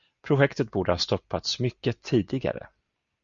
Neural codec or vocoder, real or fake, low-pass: none; real; 7.2 kHz